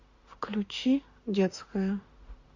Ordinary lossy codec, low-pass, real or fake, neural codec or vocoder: Opus, 64 kbps; 7.2 kHz; fake; autoencoder, 48 kHz, 32 numbers a frame, DAC-VAE, trained on Japanese speech